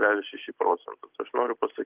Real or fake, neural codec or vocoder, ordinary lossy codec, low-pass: real; none; Opus, 16 kbps; 3.6 kHz